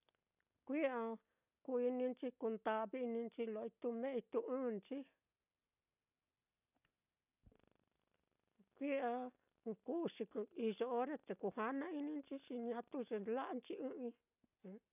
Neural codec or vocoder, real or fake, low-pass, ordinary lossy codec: none; real; 3.6 kHz; none